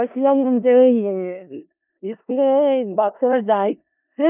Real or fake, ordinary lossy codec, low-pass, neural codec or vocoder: fake; none; 3.6 kHz; codec, 16 kHz in and 24 kHz out, 0.4 kbps, LongCat-Audio-Codec, four codebook decoder